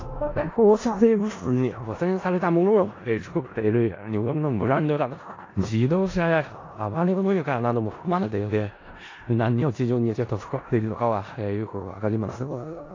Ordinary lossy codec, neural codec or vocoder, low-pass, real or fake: AAC, 32 kbps; codec, 16 kHz in and 24 kHz out, 0.4 kbps, LongCat-Audio-Codec, four codebook decoder; 7.2 kHz; fake